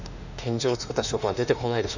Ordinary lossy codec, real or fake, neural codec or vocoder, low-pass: none; fake; autoencoder, 48 kHz, 32 numbers a frame, DAC-VAE, trained on Japanese speech; 7.2 kHz